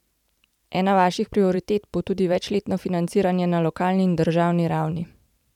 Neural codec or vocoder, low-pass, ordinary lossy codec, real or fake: vocoder, 44.1 kHz, 128 mel bands every 512 samples, BigVGAN v2; 19.8 kHz; none; fake